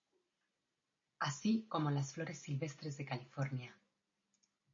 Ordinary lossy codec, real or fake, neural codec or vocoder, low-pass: MP3, 32 kbps; real; none; 7.2 kHz